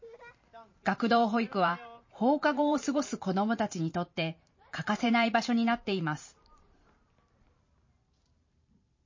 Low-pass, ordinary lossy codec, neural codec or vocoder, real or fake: 7.2 kHz; MP3, 32 kbps; vocoder, 44.1 kHz, 128 mel bands every 512 samples, BigVGAN v2; fake